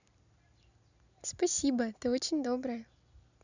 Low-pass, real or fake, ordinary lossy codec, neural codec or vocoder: 7.2 kHz; real; none; none